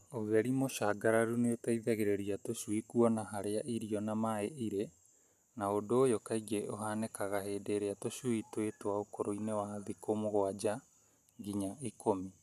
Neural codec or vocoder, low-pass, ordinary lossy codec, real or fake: none; 14.4 kHz; none; real